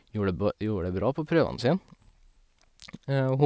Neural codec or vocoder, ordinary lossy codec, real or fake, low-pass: none; none; real; none